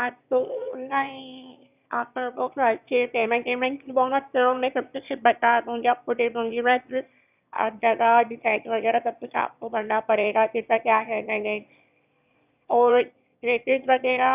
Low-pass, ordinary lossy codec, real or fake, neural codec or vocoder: 3.6 kHz; none; fake; autoencoder, 22.05 kHz, a latent of 192 numbers a frame, VITS, trained on one speaker